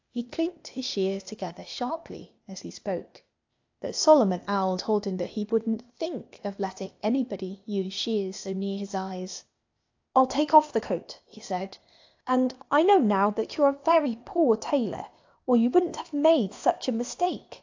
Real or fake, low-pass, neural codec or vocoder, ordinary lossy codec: fake; 7.2 kHz; codec, 16 kHz, 0.8 kbps, ZipCodec; AAC, 48 kbps